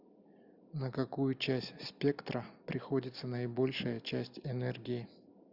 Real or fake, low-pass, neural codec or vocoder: real; 5.4 kHz; none